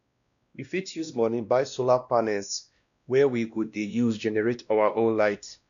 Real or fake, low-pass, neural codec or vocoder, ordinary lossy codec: fake; 7.2 kHz; codec, 16 kHz, 1 kbps, X-Codec, WavLM features, trained on Multilingual LibriSpeech; none